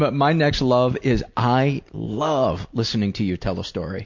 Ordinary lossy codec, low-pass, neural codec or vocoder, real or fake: MP3, 48 kbps; 7.2 kHz; none; real